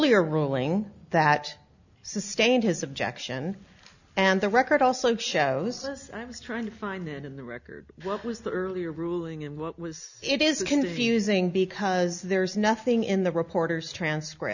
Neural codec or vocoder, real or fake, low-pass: none; real; 7.2 kHz